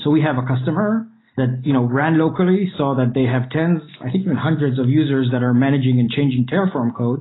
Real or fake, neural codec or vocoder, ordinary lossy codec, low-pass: real; none; AAC, 16 kbps; 7.2 kHz